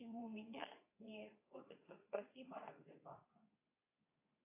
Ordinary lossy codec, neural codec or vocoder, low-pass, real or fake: AAC, 32 kbps; codec, 24 kHz, 0.9 kbps, WavTokenizer, medium speech release version 2; 3.6 kHz; fake